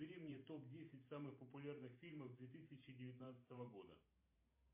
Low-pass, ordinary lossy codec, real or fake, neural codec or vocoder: 3.6 kHz; MP3, 24 kbps; fake; vocoder, 44.1 kHz, 128 mel bands every 512 samples, BigVGAN v2